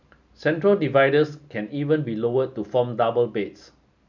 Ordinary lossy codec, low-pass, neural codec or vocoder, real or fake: none; 7.2 kHz; none; real